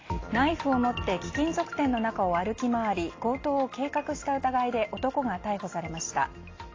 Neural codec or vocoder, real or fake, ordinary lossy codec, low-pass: none; real; AAC, 32 kbps; 7.2 kHz